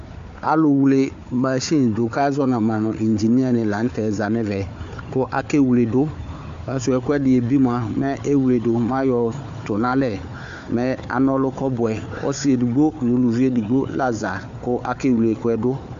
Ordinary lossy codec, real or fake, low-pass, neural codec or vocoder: AAC, 64 kbps; fake; 7.2 kHz; codec, 16 kHz, 4 kbps, FunCodec, trained on Chinese and English, 50 frames a second